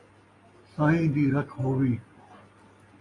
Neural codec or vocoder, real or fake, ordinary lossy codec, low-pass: none; real; AAC, 32 kbps; 10.8 kHz